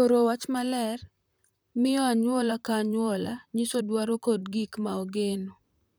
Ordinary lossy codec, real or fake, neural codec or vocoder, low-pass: none; fake; vocoder, 44.1 kHz, 128 mel bands, Pupu-Vocoder; none